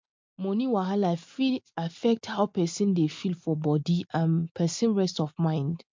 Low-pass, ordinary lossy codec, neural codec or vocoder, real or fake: 7.2 kHz; MP3, 64 kbps; none; real